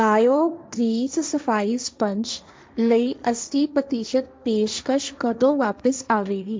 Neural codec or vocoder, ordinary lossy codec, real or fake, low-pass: codec, 16 kHz, 1.1 kbps, Voila-Tokenizer; none; fake; none